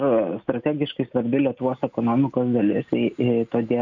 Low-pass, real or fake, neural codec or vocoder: 7.2 kHz; real; none